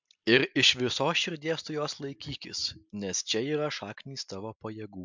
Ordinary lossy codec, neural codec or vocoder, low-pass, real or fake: MP3, 64 kbps; none; 7.2 kHz; real